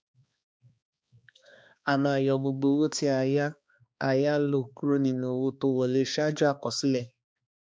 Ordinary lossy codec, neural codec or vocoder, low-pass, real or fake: none; codec, 16 kHz, 2 kbps, X-Codec, HuBERT features, trained on balanced general audio; none; fake